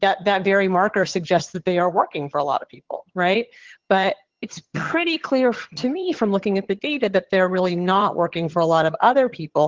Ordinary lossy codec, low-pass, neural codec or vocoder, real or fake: Opus, 16 kbps; 7.2 kHz; vocoder, 22.05 kHz, 80 mel bands, HiFi-GAN; fake